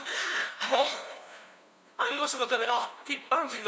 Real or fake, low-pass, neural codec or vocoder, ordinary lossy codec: fake; none; codec, 16 kHz, 0.5 kbps, FunCodec, trained on LibriTTS, 25 frames a second; none